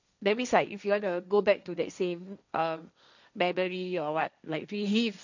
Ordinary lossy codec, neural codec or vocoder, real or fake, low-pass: none; codec, 16 kHz, 1.1 kbps, Voila-Tokenizer; fake; 7.2 kHz